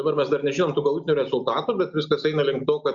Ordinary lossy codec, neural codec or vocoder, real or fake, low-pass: Opus, 64 kbps; none; real; 7.2 kHz